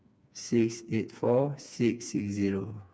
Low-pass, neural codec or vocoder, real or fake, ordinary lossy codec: none; codec, 16 kHz, 4 kbps, FreqCodec, smaller model; fake; none